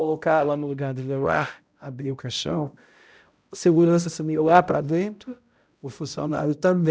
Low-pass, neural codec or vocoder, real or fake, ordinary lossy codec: none; codec, 16 kHz, 0.5 kbps, X-Codec, HuBERT features, trained on balanced general audio; fake; none